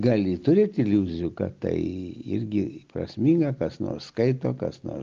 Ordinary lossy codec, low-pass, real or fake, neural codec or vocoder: Opus, 24 kbps; 7.2 kHz; real; none